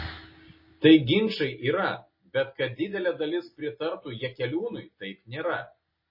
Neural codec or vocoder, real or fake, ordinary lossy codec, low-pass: none; real; MP3, 24 kbps; 5.4 kHz